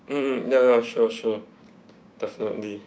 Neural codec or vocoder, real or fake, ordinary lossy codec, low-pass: codec, 16 kHz, 6 kbps, DAC; fake; none; none